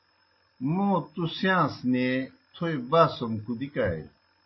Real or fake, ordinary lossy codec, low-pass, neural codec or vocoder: real; MP3, 24 kbps; 7.2 kHz; none